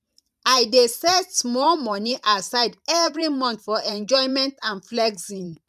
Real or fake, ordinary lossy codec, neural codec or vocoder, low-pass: fake; none; vocoder, 44.1 kHz, 128 mel bands every 512 samples, BigVGAN v2; 14.4 kHz